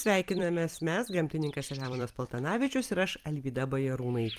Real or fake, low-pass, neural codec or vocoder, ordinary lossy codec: fake; 14.4 kHz; vocoder, 44.1 kHz, 128 mel bands every 256 samples, BigVGAN v2; Opus, 32 kbps